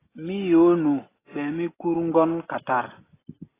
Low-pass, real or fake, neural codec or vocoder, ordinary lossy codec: 3.6 kHz; real; none; AAC, 16 kbps